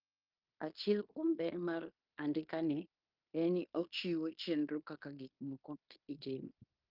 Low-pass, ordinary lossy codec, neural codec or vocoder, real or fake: 5.4 kHz; Opus, 32 kbps; codec, 16 kHz in and 24 kHz out, 0.9 kbps, LongCat-Audio-Codec, fine tuned four codebook decoder; fake